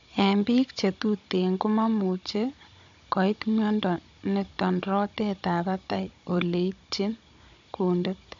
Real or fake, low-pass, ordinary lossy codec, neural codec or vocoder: fake; 7.2 kHz; AAC, 64 kbps; codec, 16 kHz, 16 kbps, FunCodec, trained on Chinese and English, 50 frames a second